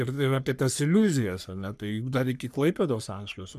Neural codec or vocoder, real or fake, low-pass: codec, 44.1 kHz, 3.4 kbps, Pupu-Codec; fake; 14.4 kHz